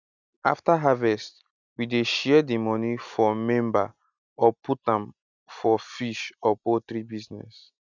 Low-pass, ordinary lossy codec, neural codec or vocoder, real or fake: 7.2 kHz; none; none; real